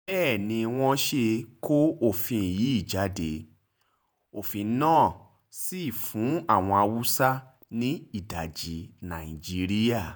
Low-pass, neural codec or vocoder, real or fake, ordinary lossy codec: none; vocoder, 48 kHz, 128 mel bands, Vocos; fake; none